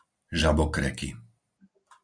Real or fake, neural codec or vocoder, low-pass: real; none; 9.9 kHz